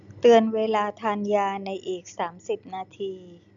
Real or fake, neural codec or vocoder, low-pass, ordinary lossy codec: real; none; 7.2 kHz; none